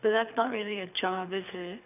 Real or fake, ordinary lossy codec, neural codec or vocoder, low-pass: fake; none; codec, 24 kHz, 6 kbps, HILCodec; 3.6 kHz